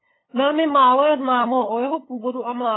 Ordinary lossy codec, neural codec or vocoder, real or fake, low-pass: AAC, 16 kbps; codec, 16 kHz, 8 kbps, FunCodec, trained on LibriTTS, 25 frames a second; fake; 7.2 kHz